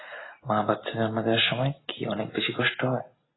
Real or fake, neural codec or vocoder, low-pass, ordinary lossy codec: real; none; 7.2 kHz; AAC, 16 kbps